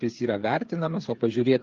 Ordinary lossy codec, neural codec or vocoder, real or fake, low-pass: Opus, 32 kbps; codec, 16 kHz, 8 kbps, FreqCodec, smaller model; fake; 7.2 kHz